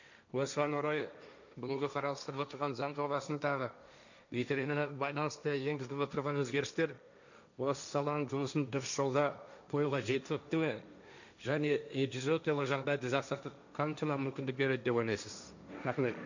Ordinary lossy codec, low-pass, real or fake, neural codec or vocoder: none; 7.2 kHz; fake; codec, 16 kHz, 1.1 kbps, Voila-Tokenizer